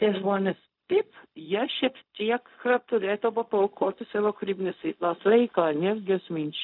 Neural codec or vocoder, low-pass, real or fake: codec, 16 kHz, 0.4 kbps, LongCat-Audio-Codec; 5.4 kHz; fake